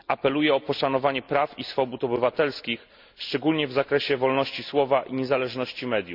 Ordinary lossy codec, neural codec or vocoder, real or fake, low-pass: MP3, 48 kbps; none; real; 5.4 kHz